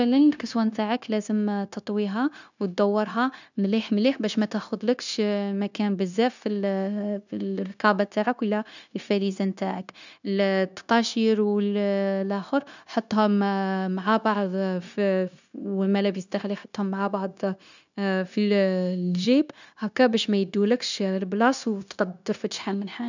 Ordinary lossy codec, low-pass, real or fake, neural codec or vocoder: none; 7.2 kHz; fake; codec, 16 kHz, 0.9 kbps, LongCat-Audio-Codec